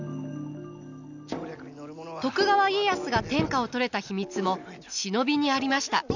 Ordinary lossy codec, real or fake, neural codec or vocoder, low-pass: none; real; none; 7.2 kHz